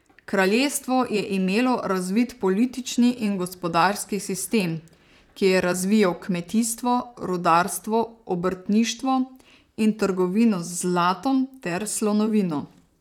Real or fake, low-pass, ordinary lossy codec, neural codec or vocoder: fake; 19.8 kHz; none; vocoder, 44.1 kHz, 128 mel bands, Pupu-Vocoder